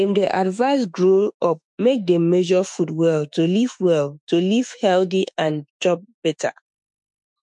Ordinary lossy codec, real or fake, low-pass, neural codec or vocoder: MP3, 64 kbps; fake; 10.8 kHz; autoencoder, 48 kHz, 32 numbers a frame, DAC-VAE, trained on Japanese speech